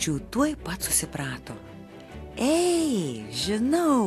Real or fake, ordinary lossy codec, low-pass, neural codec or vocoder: real; AAC, 64 kbps; 14.4 kHz; none